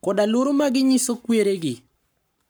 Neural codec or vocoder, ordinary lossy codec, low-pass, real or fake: codec, 44.1 kHz, 7.8 kbps, Pupu-Codec; none; none; fake